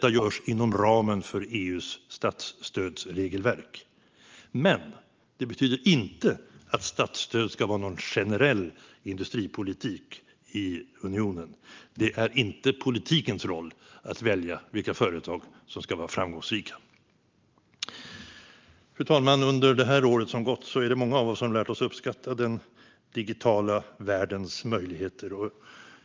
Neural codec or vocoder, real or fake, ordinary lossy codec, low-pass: none; real; Opus, 24 kbps; 7.2 kHz